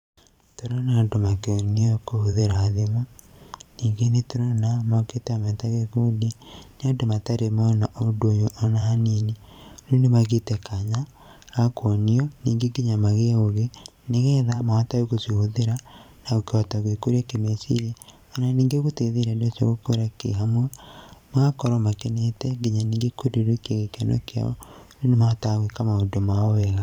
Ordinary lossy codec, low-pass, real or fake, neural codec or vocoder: none; 19.8 kHz; fake; vocoder, 44.1 kHz, 128 mel bands every 512 samples, BigVGAN v2